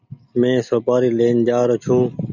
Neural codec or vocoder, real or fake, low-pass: none; real; 7.2 kHz